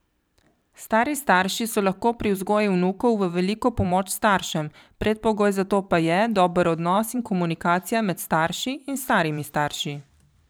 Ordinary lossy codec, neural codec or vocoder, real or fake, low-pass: none; vocoder, 44.1 kHz, 128 mel bands every 512 samples, BigVGAN v2; fake; none